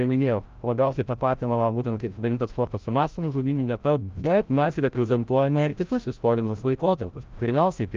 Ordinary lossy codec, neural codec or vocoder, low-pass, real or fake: Opus, 24 kbps; codec, 16 kHz, 0.5 kbps, FreqCodec, larger model; 7.2 kHz; fake